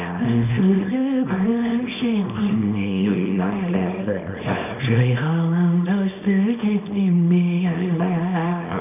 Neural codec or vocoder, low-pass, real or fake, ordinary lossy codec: codec, 24 kHz, 0.9 kbps, WavTokenizer, small release; 3.6 kHz; fake; none